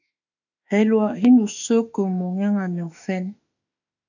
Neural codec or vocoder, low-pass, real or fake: autoencoder, 48 kHz, 32 numbers a frame, DAC-VAE, trained on Japanese speech; 7.2 kHz; fake